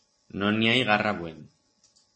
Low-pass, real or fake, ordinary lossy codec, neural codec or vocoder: 10.8 kHz; real; MP3, 32 kbps; none